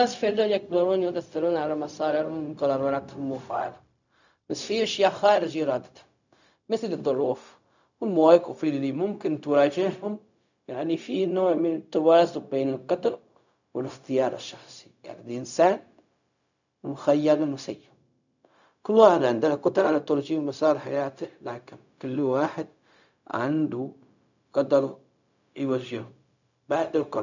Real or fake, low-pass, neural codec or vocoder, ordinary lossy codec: fake; 7.2 kHz; codec, 16 kHz, 0.4 kbps, LongCat-Audio-Codec; none